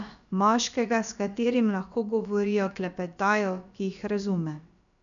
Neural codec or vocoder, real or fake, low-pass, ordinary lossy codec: codec, 16 kHz, about 1 kbps, DyCAST, with the encoder's durations; fake; 7.2 kHz; MP3, 96 kbps